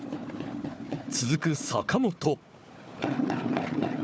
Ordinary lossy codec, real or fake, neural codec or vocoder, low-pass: none; fake; codec, 16 kHz, 4 kbps, FunCodec, trained on Chinese and English, 50 frames a second; none